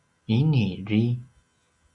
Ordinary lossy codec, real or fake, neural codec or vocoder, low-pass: Opus, 64 kbps; real; none; 10.8 kHz